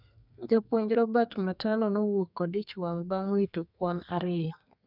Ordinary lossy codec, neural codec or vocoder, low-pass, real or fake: none; codec, 32 kHz, 1.9 kbps, SNAC; 5.4 kHz; fake